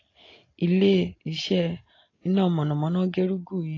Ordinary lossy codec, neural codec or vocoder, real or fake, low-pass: AAC, 32 kbps; none; real; 7.2 kHz